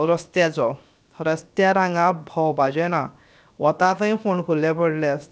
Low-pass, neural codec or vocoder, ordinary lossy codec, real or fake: none; codec, 16 kHz, 0.7 kbps, FocalCodec; none; fake